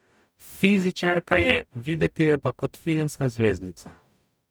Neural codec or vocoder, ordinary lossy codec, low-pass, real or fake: codec, 44.1 kHz, 0.9 kbps, DAC; none; none; fake